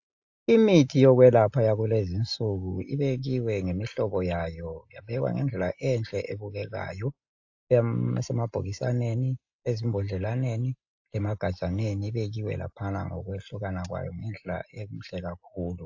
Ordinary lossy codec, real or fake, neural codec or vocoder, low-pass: AAC, 48 kbps; real; none; 7.2 kHz